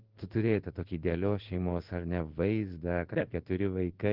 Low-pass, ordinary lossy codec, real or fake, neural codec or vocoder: 5.4 kHz; Opus, 16 kbps; fake; codec, 16 kHz in and 24 kHz out, 1 kbps, XY-Tokenizer